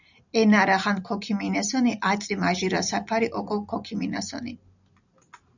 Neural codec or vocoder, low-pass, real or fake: none; 7.2 kHz; real